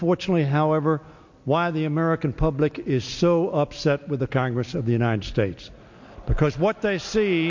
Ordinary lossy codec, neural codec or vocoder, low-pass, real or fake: MP3, 48 kbps; none; 7.2 kHz; real